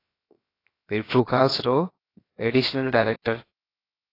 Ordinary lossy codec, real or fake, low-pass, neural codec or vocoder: AAC, 24 kbps; fake; 5.4 kHz; codec, 16 kHz, 0.7 kbps, FocalCodec